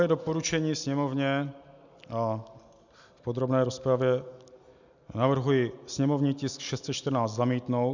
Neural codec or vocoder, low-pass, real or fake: none; 7.2 kHz; real